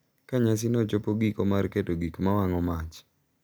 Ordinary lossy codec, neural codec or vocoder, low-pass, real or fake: none; none; none; real